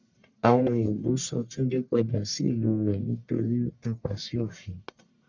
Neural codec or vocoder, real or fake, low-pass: codec, 44.1 kHz, 1.7 kbps, Pupu-Codec; fake; 7.2 kHz